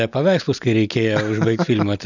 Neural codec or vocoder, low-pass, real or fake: none; 7.2 kHz; real